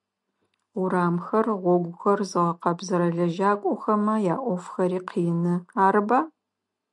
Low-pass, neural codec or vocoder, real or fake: 10.8 kHz; none; real